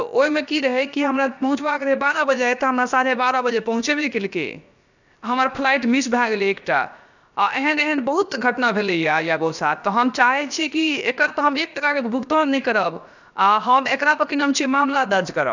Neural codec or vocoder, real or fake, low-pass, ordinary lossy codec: codec, 16 kHz, about 1 kbps, DyCAST, with the encoder's durations; fake; 7.2 kHz; none